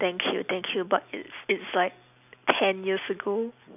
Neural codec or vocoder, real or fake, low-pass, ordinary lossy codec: none; real; 3.6 kHz; none